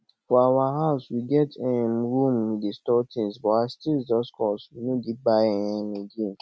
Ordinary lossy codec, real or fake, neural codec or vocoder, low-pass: none; real; none; none